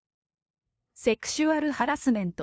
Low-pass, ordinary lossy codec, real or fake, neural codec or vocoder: none; none; fake; codec, 16 kHz, 2 kbps, FunCodec, trained on LibriTTS, 25 frames a second